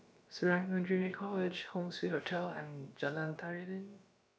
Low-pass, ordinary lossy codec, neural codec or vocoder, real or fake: none; none; codec, 16 kHz, about 1 kbps, DyCAST, with the encoder's durations; fake